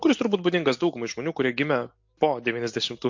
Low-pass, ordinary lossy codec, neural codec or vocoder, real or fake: 7.2 kHz; MP3, 48 kbps; none; real